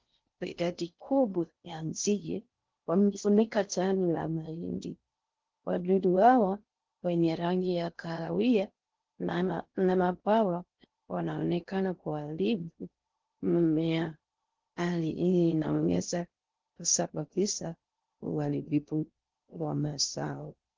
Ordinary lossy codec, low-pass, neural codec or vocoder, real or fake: Opus, 16 kbps; 7.2 kHz; codec, 16 kHz in and 24 kHz out, 0.6 kbps, FocalCodec, streaming, 4096 codes; fake